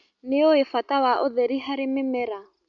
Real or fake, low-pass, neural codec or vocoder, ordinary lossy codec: real; 7.2 kHz; none; MP3, 64 kbps